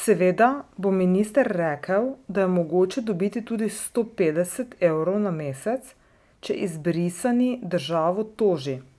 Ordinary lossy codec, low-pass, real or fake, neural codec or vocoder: none; none; real; none